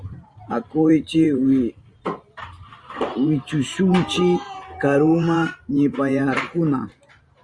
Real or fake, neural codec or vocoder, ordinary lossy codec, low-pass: fake; vocoder, 44.1 kHz, 128 mel bands every 512 samples, BigVGAN v2; Opus, 64 kbps; 9.9 kHz